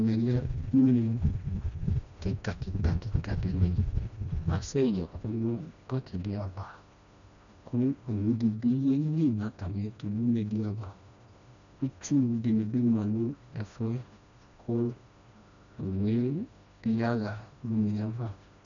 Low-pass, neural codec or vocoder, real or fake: 7.2 kHz; codec, 16 kHz, 1 kbps, FreqCodec, smaller model; fake